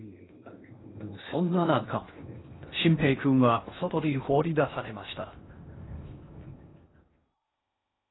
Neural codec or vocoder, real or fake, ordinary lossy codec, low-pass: codec, 16 kHz in and 24 kHz out, 0.6 kbps, FocalCodec, streaming, 2048 codes; fake; AAC, 16 kbps; 7.2 kHz